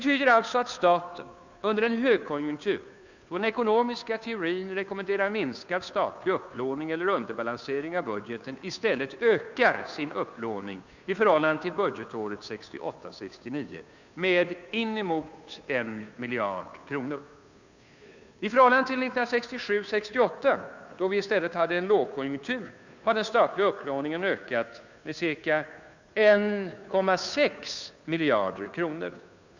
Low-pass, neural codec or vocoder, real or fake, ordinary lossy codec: 7.2 kHz; codec, 16 kHz, 2 kbps, FunCodec, trained on Chinese and English, 25 frames a second; fake; none